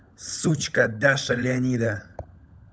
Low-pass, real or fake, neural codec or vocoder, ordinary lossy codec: none; fake; codec, 16 kHz, 16 kbps, FunCodec, trained on LibriTTS, 50 frames a second; none